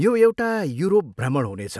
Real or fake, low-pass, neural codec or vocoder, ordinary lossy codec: real; none; none; none